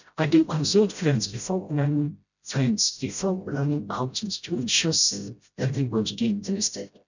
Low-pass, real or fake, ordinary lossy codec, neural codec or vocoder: 7.2 kHz; fake; none; codec, 16 kHz, 0.5 kbps, FreqCodec, smaller model